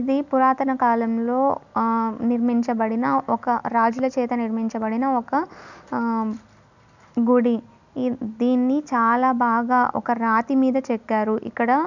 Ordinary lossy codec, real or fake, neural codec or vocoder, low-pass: none; real; none; 7.2 kHz